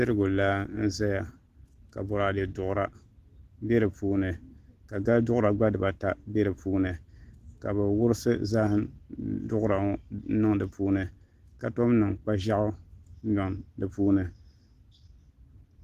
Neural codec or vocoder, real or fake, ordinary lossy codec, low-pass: none; real; Opus, 16 kbps; 14.4 kHz